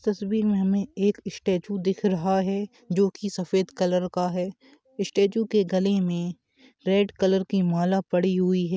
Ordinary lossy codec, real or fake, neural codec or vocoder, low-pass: none; real; none; none